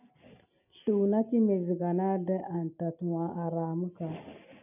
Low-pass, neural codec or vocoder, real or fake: 3.6 kHz; none; real